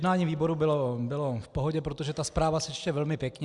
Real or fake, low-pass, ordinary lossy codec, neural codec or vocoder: real; 10.8 kHz; Opus, 64 kbps; none